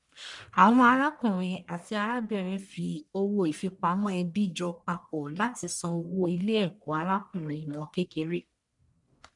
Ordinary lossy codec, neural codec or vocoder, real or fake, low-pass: none; codec, 44.1 kHz, 1.7 kbps, Pupu-Codec; fake; 10.8 kHz